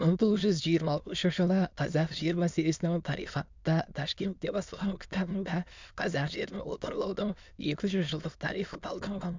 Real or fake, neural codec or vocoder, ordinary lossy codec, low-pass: fake; autoencoder, 22.05 kHz, a latent of 192 numbers a frame, VITS, trained on many speakers; MP3, 64 kbps; 7.2 kHz